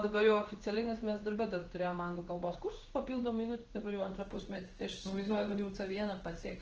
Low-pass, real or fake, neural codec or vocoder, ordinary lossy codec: 7.2 kHz; fake; codec, 16 kHz in and 24 kHz out, 1 kbps, XY-Tokenizer; Opus, 16 kbps